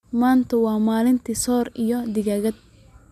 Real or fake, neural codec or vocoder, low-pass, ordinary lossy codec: real; none; 14.4 kHz; MP3, 96 kbps